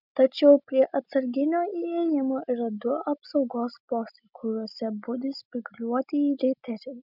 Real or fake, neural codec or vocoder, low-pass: real; none; 5.4 kHz